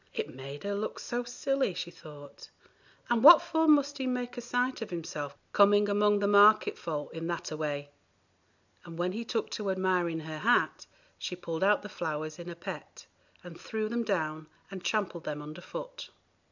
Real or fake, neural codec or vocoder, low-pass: real; none; 7.2 kHz